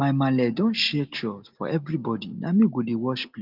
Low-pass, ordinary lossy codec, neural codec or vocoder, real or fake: 5.4 kHz; Opus, 32 kbps; none; real